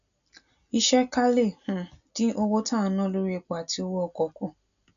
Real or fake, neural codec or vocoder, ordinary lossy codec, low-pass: real; none; none; 7.2 kHz